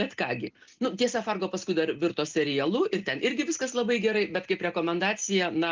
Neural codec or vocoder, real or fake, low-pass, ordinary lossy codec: none; real; 7.2 kHz; Opus, 24 kbps